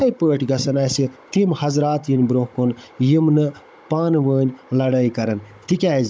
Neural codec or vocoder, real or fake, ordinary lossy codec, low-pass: codec, 16 kHz, 16 kbps, FunCodec, trained on Chinese and English, 50 frames a second; fake; none; none